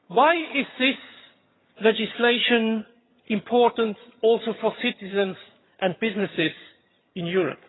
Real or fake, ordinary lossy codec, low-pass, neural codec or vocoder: fake; AAC, 16 kbps; 7.2 kHz; vocoder, 22.05 kHz, 80 mel bands, HiFi-GAN